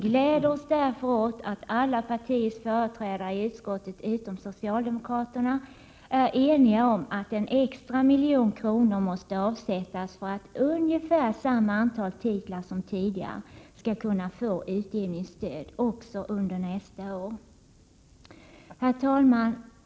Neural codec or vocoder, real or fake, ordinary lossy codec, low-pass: none; real; none; none